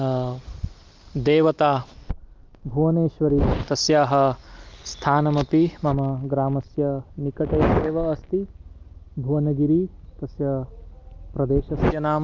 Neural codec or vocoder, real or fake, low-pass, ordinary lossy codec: none; real; 7.2 kHz; Opus, 32 kbps